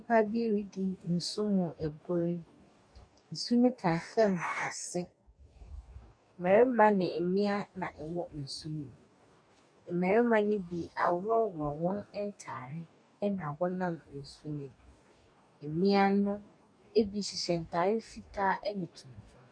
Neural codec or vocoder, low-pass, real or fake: codec, 44.1 kHz, 2.6 kbps, DAC; 9.9 kHz; fake